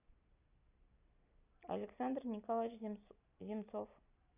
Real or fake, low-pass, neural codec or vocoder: real; 3.6 kHz; none